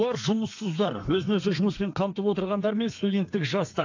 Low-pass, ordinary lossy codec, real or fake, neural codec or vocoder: 7.2 kHz; AAC, 48 kbps; fake; codec, 32 kHz, 1.9 kbps, SNAC